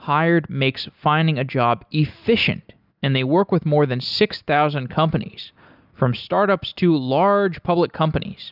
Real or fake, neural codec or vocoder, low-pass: real; none; 5.4 kHz